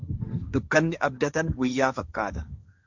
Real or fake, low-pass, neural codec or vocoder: fake; 7.2 kHz; codec, 16 kHz, 1.1 kbps, Voila-Tokenizer